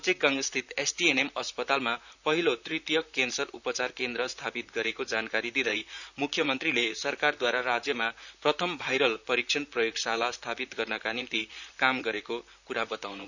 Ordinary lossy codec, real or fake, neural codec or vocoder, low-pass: none; fake; vocoder, 44.1 kHz, 128 mel bands, Pupu-Vocoder; 7.2 kHz